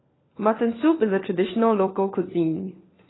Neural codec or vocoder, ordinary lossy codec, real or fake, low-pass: codec, 16 kHz, 4 kbps, FunCodec, trained on LibriTTS, 50 frames a second; AAC, 16 kbps; fake; 7.2 kHz